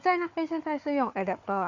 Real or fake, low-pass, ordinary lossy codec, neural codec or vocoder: fake; 7.2 kHz; Opus, 64 kbps; codec, 16 kHz, 4 kbps, FunCodec, trained on Chinese and English, 50 frames a second